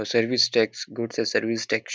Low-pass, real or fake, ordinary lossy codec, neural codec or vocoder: none; real; none; none